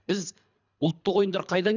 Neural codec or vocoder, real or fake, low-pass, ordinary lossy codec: codec, 24 kHz, 3 kbps, HILCodec; fake; 7.2 kHz; none